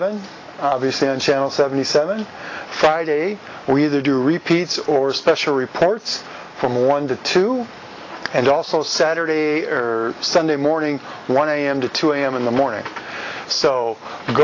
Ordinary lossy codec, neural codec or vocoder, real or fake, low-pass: AAC, 32 kbps; none; real; 7.2 kHz